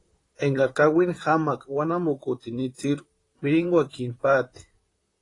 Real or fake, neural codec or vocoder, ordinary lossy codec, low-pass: fake; vocoder, 44.1 kHz, 128 mel bands, Pupu-Vocoder; AAC, 32 kbps; 10.8 kHz